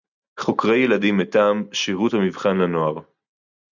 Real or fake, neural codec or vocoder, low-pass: real; none; 7.2 kHz